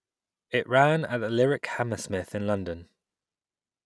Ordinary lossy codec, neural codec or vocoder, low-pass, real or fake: none; none; none; real